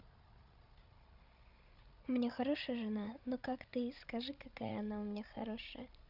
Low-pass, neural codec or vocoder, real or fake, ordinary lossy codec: 5.4 kHz; codec, 16 kHz, 16 kbps, FreqCodec, larger model; fake; none